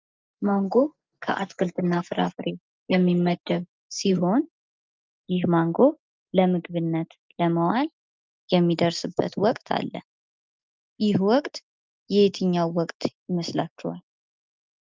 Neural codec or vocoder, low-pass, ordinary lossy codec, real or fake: none; 7.2 kHz; Opus, 24 kbps; real